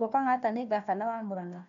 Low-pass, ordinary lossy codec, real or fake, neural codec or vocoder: 7.2 kHz; none; fake; codec, 16 kHz, 1 kbps, FunCodec, trained on Chinese and English, 50 frames a second